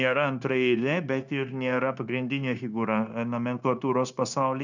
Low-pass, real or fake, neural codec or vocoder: 7.2 kHz; fake; codec, 16 kHz, 0.9 kbps, LongCat-Audio-Codec